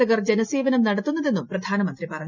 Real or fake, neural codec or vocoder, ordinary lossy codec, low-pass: real; none; none; 7.2 kHz